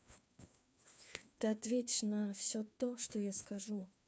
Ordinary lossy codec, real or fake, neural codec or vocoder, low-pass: none; fake; codec, 16 kHz, 2 kbps, FreqCodec, larger model; none